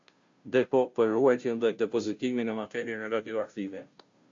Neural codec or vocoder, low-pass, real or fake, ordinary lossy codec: codec, 16 kHz, 0.5 kbps, FunCodec, trained on Chinese and English, 25 frames a second; 7.2 kHz; fake; MP3, 48 kbps